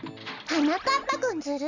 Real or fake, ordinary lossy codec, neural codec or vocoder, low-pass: real; none; none; 7.2 kHz